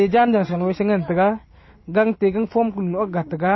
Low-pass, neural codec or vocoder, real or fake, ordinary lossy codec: 7.2 kHz; none; real; MP3, 24 kbps